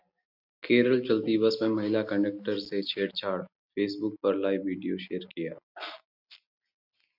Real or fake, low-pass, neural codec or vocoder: real; 5.4 kHz; none